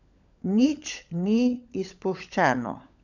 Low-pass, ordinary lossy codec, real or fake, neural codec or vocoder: 7.2 kHz; none; fake; codec, 16 kHz, 16 kbps, FunCodec, trained on LibriTTS, 50 frames a second